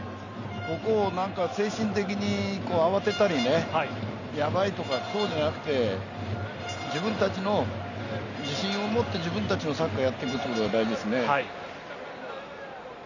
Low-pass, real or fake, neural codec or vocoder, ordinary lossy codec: 7.2 kHz; real; none; none